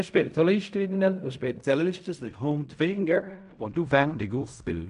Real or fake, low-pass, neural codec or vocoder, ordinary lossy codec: fake; 10.8 kHz; codec, 16 kHz in and 24 kHz out, 0.4 kbps, LongCat-Audio-Codec, fine tuned four codebook decoder; none